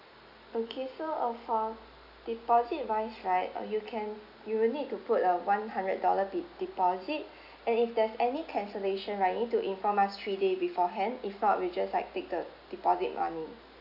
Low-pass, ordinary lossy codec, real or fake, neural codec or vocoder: 5.4 kHz; none; real; none